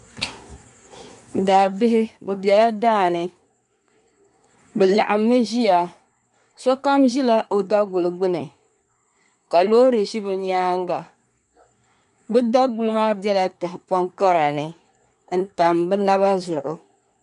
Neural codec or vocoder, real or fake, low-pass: codec, 24 kHz, 1 kbps, SNAC; fake; 10.8 kHz